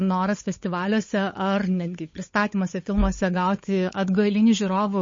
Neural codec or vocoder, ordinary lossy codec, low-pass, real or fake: codec, 16 kHz, 8 kbps, FunCodec, trained on Chinese and English, 25 frames a second; MP3, 32 kbps; 7.2 kHz; fake